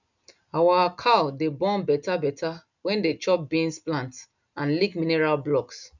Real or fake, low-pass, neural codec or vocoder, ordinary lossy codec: real; 7.2 kHz; none; none